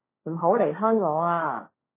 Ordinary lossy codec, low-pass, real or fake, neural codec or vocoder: MP3, 16 kbps; 3.6 kHz; fake; codec, 16 kHz, 1.1 kbps, Voila-Tokenizer